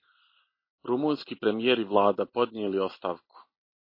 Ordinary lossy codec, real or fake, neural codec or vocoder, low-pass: MP3, 24 kbps; real; none; 5.4 kHz